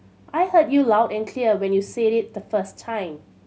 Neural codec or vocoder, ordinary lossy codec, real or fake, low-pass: none; none; real; none